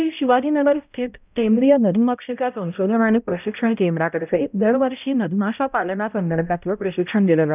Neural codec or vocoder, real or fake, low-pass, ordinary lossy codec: codec, 16 kHz, 0.5 kbps, X-Codec, HuBERT features, trained on balanced general audio; fake; 3.6 kHz; none